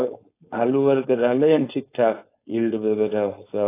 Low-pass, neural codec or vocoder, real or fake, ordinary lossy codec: 3.6 kHz; codec, 16 kHz, 4.8 kbps, FACodec; fake; AAC, 24 kbps